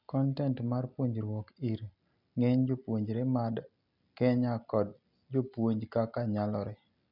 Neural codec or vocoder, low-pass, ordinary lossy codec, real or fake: none; 5.4 kHz; none; real